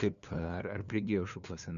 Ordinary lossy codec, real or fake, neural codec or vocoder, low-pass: MP3, 96 kbps; fake; codec, 16 kHz, 2 kbps, FunCodec, trained on LibriTTS, 25 frames a second; 7.2 kHz